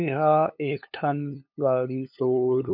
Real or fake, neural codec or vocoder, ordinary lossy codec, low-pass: fake; codec, 16 kHz, 2 kbps, FunCodec, trained on LibriTTS, 25 frames a second; MP3, 48 kbps; 5.4 kHz